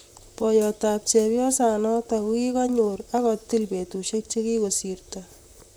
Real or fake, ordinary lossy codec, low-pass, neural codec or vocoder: fake; none; none; vocoder, 44.1 kHz, 128 mel bands, Pupu-Vocoder